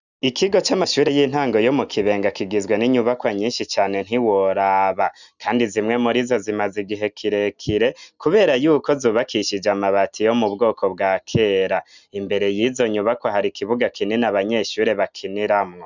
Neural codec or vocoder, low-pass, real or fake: none; 7.2 kHz; real